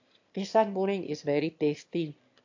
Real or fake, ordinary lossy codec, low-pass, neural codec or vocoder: fake; AAC, 48 kbps; 7.2 kHz; autoencoder, 22.05 kHz, a latent of 192 numbers a frame, VITS, trained on one speaker